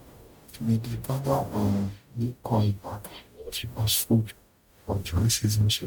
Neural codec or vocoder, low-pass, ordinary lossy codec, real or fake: codec, 44.1 kHz, 0.9 kbps, DAC; 19.8 kHz; none; fake